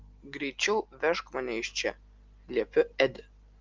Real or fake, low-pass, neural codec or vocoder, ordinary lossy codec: real; 7.2 kHz; none; Opus, 32 kbps